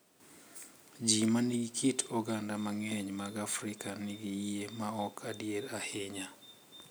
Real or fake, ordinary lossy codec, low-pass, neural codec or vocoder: real; none; none; none